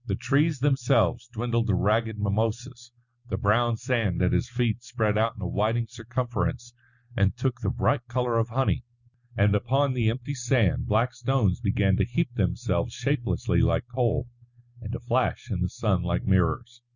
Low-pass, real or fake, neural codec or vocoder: 7.2 kHz; real; none